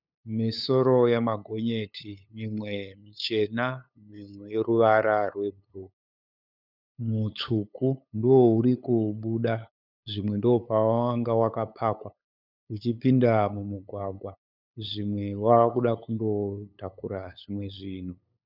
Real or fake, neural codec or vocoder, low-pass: fake; codec, 16 kHz, 8 kbps, FunCodec, trained on LibriTTS, 25 frames a second; 5.4 kHz